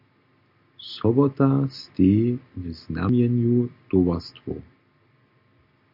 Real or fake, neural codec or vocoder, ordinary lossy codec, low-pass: real; none; MP3, 48 kbps; 5.4 kHz